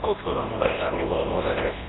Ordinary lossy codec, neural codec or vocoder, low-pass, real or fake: AAC, 16 kbps; codec, 24 kHz, 0.9 kbps, WavTokenizer, large speech release; 7.2 kHz; fake